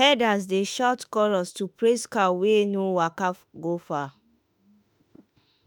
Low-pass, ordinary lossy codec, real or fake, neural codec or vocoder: none; none; fake; autoencoder, 48 kHz, 32 numbers a frame, DAC-VAE, trained on Japanese speech